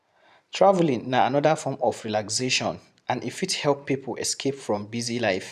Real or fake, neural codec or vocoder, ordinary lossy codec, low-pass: fake; vocoder, 44.1 kHz, 128 mel bands every 512 samples, BigVGAN v2; none; 14.4 kHz